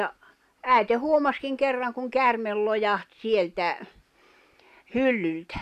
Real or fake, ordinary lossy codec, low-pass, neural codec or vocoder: real; none; 14.4 kHz; none